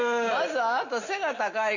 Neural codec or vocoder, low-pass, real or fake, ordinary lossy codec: none; 7.2 kHz; real; none